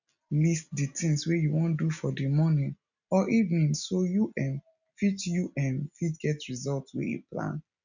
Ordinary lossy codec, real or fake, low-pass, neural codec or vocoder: none; real; 7.2 kHz; none